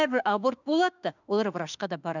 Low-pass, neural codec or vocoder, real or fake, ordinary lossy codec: 7.2 kHz; autoencoder, 48 kHz, 32 numbers a frame, DAC-VAE, trained on Japanese speech; fake; none